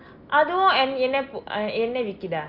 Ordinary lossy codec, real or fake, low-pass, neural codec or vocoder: Opus, 24 kbps; real; 5.4 kHz; none